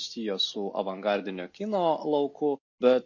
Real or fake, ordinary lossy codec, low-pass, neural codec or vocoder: real; MP3, 32 kbps; 7.2 kHz; none